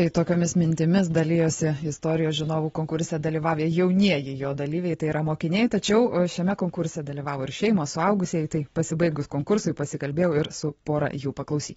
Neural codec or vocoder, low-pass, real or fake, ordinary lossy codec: none; 19.8 kHz; real; AAC, 24 kbps